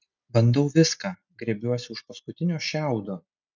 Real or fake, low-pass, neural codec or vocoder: real; 7.2 kHz; none